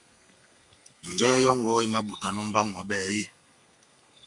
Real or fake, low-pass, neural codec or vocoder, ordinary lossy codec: fake; 10.8 kHz; codec, 32 kHz, 1.9 kbps, SNAC; MP3, 96 kbps